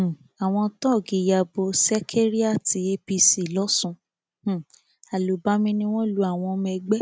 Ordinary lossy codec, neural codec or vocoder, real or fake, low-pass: none; none; real; none